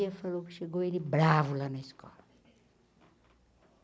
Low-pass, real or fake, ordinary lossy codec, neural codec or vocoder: none; real; none; none